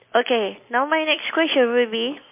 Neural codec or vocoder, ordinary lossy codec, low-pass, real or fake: none; MP3, 24 kbps; 3.6 kHz; real